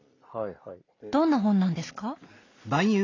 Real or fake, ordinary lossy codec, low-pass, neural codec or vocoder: real; AAC, 32 kbps; 7.2 kHz; none